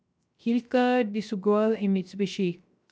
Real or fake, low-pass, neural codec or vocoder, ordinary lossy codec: fake; none; codec, 16 kHz, 0.3 kbps, FocalCodec; none